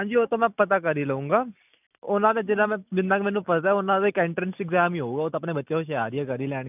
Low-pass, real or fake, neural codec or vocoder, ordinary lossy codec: 3.6 kHz; real; none; none